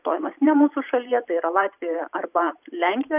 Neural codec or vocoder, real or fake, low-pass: vocoder, 44.1 kHz, 128 mel bands every 512 samples, BigVGAN v2; fake; 3.6 kHz